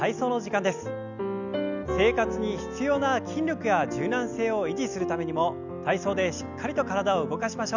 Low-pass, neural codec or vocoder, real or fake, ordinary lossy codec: 7.2 kHz; none; real; none